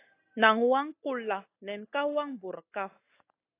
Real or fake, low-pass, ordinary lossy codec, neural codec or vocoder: real; 3.6 kHz; AAC, 24 kbps; none